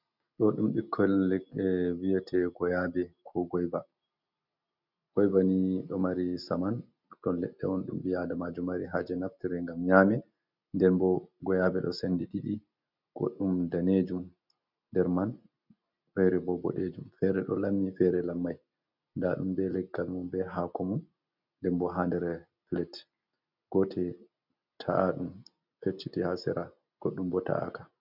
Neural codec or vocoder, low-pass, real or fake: none; 5.4 kHz; real